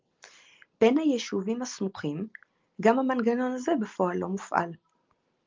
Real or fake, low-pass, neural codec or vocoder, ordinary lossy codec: real; 7.2 kHz; none; Opus, 32 kbps